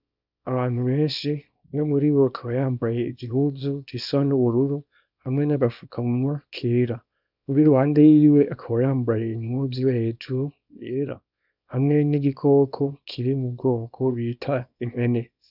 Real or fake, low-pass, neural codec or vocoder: fake; 5.4 kHz; codec, 24 kHz, 0.9 kbps, WavTokenizer, small release